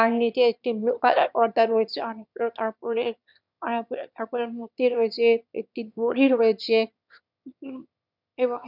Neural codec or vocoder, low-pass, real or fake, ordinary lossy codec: autoencoder, 22.05 kHz, a latent of 192 numbers a frame, VITS, trained on one speaker; 5.4 kHz; fake; none